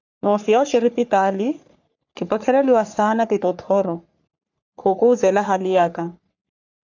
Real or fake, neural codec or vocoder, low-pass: fake; codec, 44.1 kHz, 3.4 kbps, Pupu-Codec; 7.2 kHz